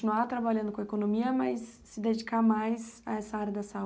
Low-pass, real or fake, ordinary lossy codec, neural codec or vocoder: none; real; none; none